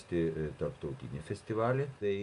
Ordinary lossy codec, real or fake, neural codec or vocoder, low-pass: AAC, 96 kbps; real; none; 10.8 kHz